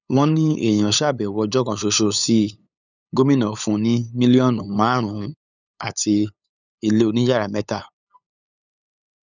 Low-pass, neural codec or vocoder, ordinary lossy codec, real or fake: 7.2 kHz; codec, 16 kHz, 8 kbps, FunCodec, trained on LibriTTS, 25 frames a second; none; fake